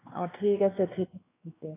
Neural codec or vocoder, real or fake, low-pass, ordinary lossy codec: codec, 16 kHz, 2 kbps, X-Codec, WavLM features, trained on Multilingual LibriSpeech; fake; 3.6 kHz; AAC, 16 kbps